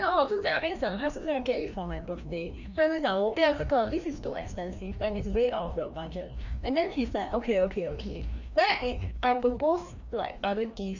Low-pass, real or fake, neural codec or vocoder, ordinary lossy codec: 7.2 kHz; fake; codec, 16 kHz, 1 kbps, FreqCodec, larger model; none